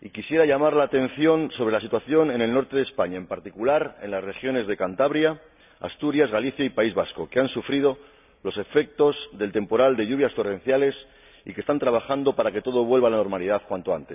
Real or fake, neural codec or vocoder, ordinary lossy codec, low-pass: real; none; none; 3.6 kHz